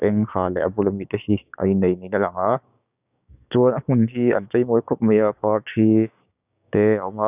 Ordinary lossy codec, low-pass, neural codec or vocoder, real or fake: none; 3.6 kHz; codec, 16 kHz, 6 kbps, DAC; fake